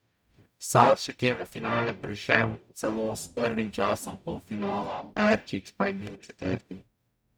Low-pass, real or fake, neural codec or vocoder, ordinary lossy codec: none; fake; codec, 44.1 kHz, 0.9 kbps, DAC; none